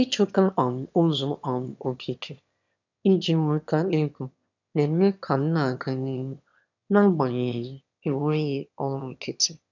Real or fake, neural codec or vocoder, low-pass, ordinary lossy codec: fake; autoencoder, 22.05 kHz, a latent of 192 numbers a frame, VITS, trained on one speaker; 7.2 kHz; none